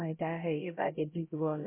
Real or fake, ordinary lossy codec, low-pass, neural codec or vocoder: fake; MP3, 24 kbps; 3.6 kHz; codec, 16 kHz, 0.5 kbps, X-Codec, HuBERT features, trained on LibriSpeech